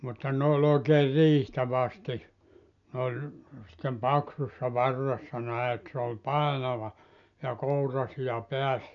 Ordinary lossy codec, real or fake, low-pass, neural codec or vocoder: none; real; 7.2 kHz; none